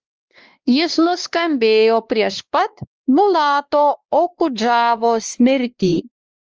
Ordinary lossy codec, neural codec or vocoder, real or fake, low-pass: Opus, 24 kbps; codec, 16 kHz, 2 kbps, X-Codec, WavLM features, trained on Multilingual LibriSpeech; fake; 7.2 kHz